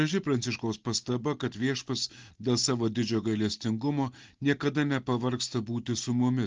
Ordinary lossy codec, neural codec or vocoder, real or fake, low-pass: Opus, 16 kbps; none; real; 7.2 kHz